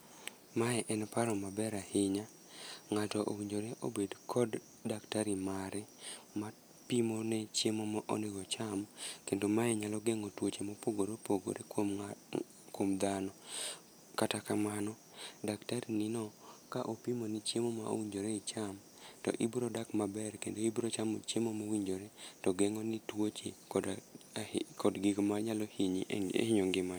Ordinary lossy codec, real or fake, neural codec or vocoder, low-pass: none; real; none; none